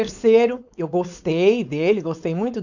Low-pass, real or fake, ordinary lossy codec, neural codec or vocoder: 7.2 kHz; fake; none; codec, 16 kHz, 4.8 kbps, FACodec